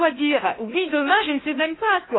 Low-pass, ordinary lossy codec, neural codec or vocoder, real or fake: 7.2 kHz; AAC, 16 kbps; codec, 16 kHz, 1 kbps, FunCodec, trained on Chinese and English, 50 frames a second; fake